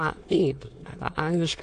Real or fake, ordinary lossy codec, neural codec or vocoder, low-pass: fake; AAC, 48 kbps; autoencoder, 22.05 kHz, a latent of 192 numbers a frame, VITS, trained on many speakers; 9.9 kHz